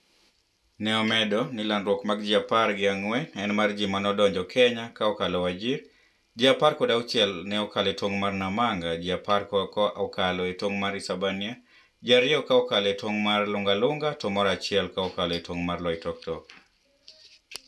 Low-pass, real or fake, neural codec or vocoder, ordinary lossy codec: none; real; none; none